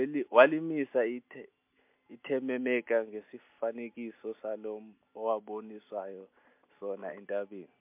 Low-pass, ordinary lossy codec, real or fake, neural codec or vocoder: 3.6 kHz; MP3, 32 kbps; real; none